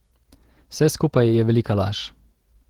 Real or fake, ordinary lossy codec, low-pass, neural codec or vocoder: fake; Opus, 16 kbps; 19.8 kHz; vocoder, 48 kHz, 128 mel bands, Vocos